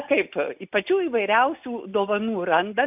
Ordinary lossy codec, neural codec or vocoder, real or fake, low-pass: AAC, 32 kbps; none; real; 3.6 kHz